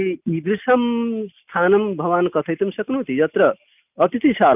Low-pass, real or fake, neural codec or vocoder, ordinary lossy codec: 3.6 kHz; real; none; none